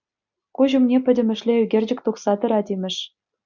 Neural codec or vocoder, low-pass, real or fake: none; 7.2 kHz; real